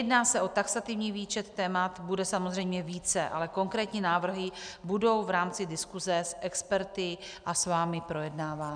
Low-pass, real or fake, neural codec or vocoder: 9.9 kHz; real; none